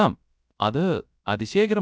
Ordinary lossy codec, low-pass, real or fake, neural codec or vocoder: none; none; fake; codec, 16 kHz, 0.3 kbps, FocalCodec